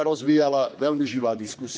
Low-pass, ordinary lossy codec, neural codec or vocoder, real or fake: none; none; codec, 16 kHz, 2 kbps, X-Codec, HuBERT features, trained on general audio; fake